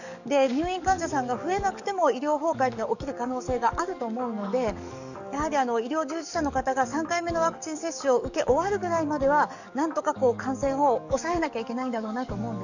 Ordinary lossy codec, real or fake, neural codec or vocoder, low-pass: none; fake; codec, 44.1 kHz, 7.8 kbps, DAC; 7.2 kHz